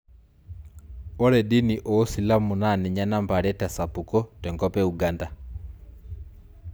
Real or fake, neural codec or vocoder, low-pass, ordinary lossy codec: real; none; none; none